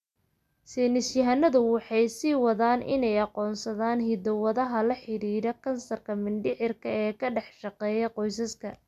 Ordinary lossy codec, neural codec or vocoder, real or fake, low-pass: none; none; real; 14.4 kHz